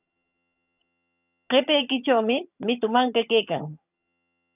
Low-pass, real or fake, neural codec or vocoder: 3.6 kHz; fake; vocoder, 22.05 kHz, 80 mel bands, HiFi-GAN